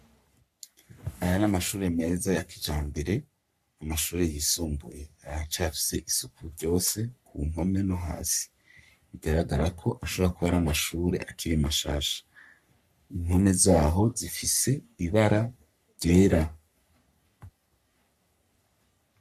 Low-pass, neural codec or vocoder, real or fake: 14.4 kHz; codec, 44.1 kHz, 3.4 kbps, Pupu-Codec; fake